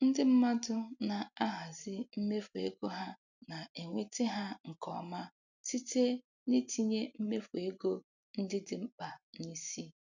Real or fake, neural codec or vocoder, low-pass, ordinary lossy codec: real; none; 7.2 kHz; none